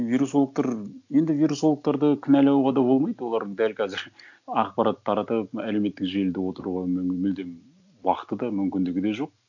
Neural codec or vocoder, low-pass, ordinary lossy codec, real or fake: none; 7.2 kHz; none; real